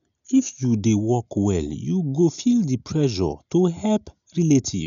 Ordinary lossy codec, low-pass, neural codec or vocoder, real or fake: none; 7.2 kHz; none; real